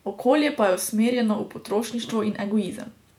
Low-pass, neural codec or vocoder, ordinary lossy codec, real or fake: 19.8 kHz; vocoder, 48 kHz, 128 mel bands, Vocos; MP3, 96 kbps; fake